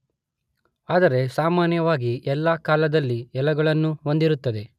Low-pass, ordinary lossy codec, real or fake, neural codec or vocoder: 14.4 kHz; none; real; none